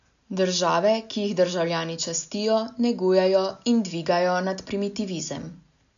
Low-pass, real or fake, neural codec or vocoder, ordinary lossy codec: 7.2 kHz; real; none; none